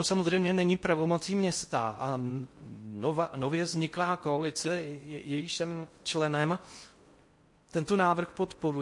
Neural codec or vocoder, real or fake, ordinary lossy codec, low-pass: codec, 16 kHz in and 24 kHz out, 0.6 kbps, FocalCodec, streaming, 4096 codes; fake; MP3, 48 kbps; 10.8 kHz